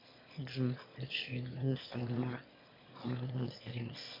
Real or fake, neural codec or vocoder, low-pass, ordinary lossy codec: fake; autoencoder, 22.05 kHz, a latent of 192 numbers a frame, VITS, trained on one speaker; 5.4 kHz; MP3, 32 kbps